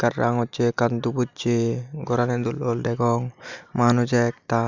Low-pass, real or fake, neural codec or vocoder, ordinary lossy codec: 7.2 kHz; real; none; none